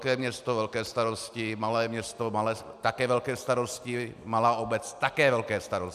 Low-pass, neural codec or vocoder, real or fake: 14.4 kHz; none; real